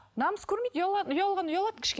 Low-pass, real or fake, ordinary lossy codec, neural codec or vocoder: none; real; none; none